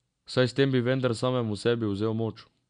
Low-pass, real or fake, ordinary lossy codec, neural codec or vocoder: 9.9 kHz; real; none; none